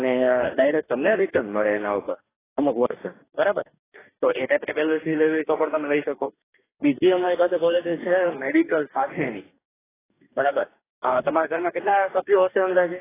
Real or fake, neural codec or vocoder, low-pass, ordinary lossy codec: fake; codec, 44.1 kHz, 2.6 kbps, DAC; 3.6 kHz; AAC, 16 kbps